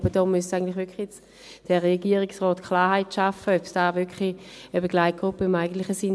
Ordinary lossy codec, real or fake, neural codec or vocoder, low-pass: none; real; none; none